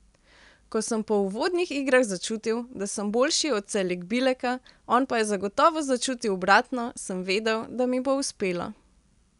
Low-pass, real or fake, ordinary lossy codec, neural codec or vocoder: 10.8 kHz; real; none; none